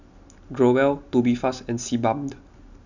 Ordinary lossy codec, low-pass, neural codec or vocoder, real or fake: none; 7.2 kHz; none; real